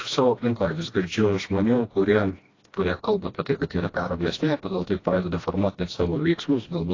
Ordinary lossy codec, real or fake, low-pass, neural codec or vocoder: AAC, 32 kbps; fake; 7.2 kHz; codec, 16 kHz, 1 kbps, FreqCodec, smaller model